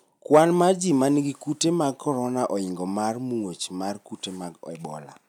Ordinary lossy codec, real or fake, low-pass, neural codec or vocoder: none; real; 19.8 kHz; none